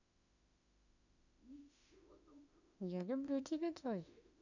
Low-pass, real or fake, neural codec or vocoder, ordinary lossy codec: 7.2 kHz; fake; autoencoder, 48 kHz, 32 numbers a frame, DAC-VAE, trained on Japanese speech; none